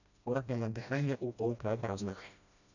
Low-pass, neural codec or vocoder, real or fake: 7.2 kHz; codec, 16 kHz, 1 kbps, FreqCodec, smaller model; fake